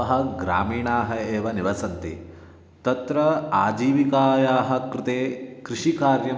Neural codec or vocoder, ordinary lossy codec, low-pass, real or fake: none; none; none; real